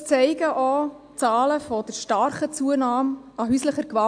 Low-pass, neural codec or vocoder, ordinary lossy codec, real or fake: 9.9 kHz; none; AAC, 64 kbps; real